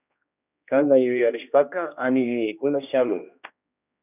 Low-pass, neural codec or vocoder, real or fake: 3.6 kHz; codec, 16 kHz, 1 kbps, X-Codec, HuBERT features, trained on general audio; fake